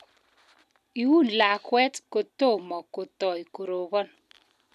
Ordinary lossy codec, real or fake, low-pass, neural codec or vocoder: none; real; 14.4 kHz; none